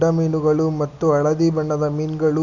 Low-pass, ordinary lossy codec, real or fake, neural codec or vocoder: 7.2 kHz; none; real; none